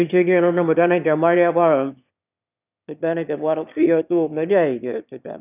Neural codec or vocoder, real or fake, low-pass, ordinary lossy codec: autoencoder, 22.05 kHz, a latent of 192 numbers a frame, VITS, trained on one speaker; fake; 3.6 kHz; none